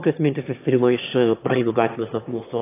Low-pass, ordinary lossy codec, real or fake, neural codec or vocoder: 3.6 kHz; AAC, 24 kbps; fake; autoencoder, 22.05 kHz, a latent of 192 numbers a frame, VITS, trained on one speaker